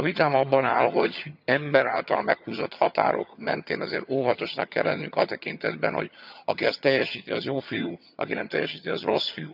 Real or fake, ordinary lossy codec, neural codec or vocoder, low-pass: fake; none; vocoder, 22.05 kHz, 80 mel bands, HiFi-GAN; 5.4 kHz